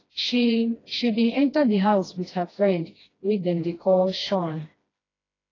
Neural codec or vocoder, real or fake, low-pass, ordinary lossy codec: codec, 16 kHz, 1 kbps, FreqCodec, smaller model; fake; 7.2 kHz; AAC, 32 kbps